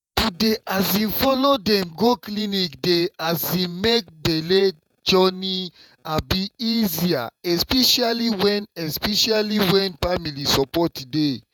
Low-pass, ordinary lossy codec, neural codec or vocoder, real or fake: 19.8 kHz; none; vocoder, 48 kHz, 128 mel bands, Vocos; fake